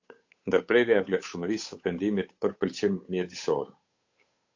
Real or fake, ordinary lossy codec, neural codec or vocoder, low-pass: fake; AAC, 48 kbps; codec, 16 kHz, 8 kbps, FunCodec, trained on Chinese and English, 25 frames a second; 7.2 kHz